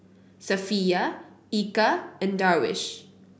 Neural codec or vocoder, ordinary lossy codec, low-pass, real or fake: none; none; none; real